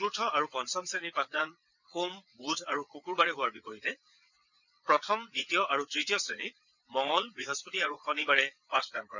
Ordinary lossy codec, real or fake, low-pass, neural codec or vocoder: none; fake; 7.2 kHz; vocoder, 22.05 kHz, 80 mel bands, WaveNeXt